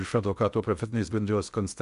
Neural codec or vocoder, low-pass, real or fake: codec, 16 kHz in and 24 kHz out, 0.6 kbps, FocalCodec, streaming, 2048 codes; 10.8 kHz; fake